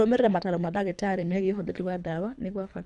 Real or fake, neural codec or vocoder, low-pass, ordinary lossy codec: fake; codec, 24 kHz, 3 kbps, HILCodec; 10.8 kHz; none